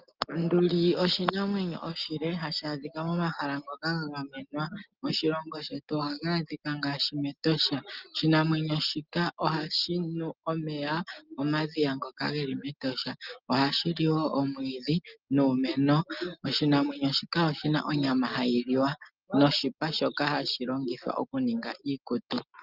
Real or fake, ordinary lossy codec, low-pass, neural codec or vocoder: real; Opus, 32 kbps; 5.4 kHz; none